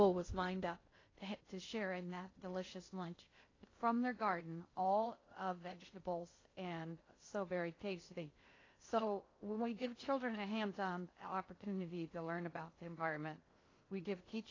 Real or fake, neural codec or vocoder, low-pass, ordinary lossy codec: fake; codec, 16 kHz in and 24 kHz out, 0.6 kbps, FocalCodec, streaming, 2048 codes; 7.2 kHz; AAC, 32 kbps